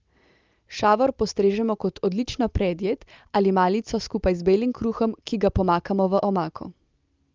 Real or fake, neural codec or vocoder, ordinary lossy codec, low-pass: real; none; Opus, 32 kbps; 7.2 kHz